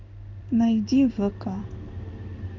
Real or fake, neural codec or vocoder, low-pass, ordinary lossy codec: fake; codec, 16 kHz in and 24 kHz out, 1 kbps, XY-Tokenizer; 7.2 kHz; none